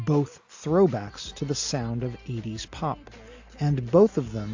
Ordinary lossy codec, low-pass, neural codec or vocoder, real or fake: AAC, 48 kbps; 7.2 kHz; none; real